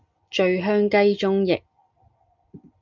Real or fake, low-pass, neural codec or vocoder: real; 7.2 kHz; none